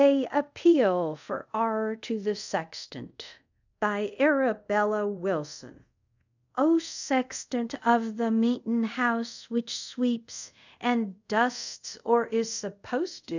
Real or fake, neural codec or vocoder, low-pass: fake; codec, 24 kHz, 0.5 kbps, DualCodec; 7.2 kHz